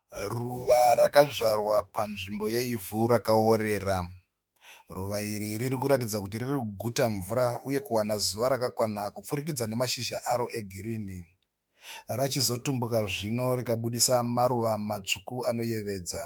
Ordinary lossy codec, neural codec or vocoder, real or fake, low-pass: MP3, 96 kbps; autoencoder, 48 kHz, 32 numbers a frame, DAC-VAE, trained on Japanese speech; fake; 19.8 kHz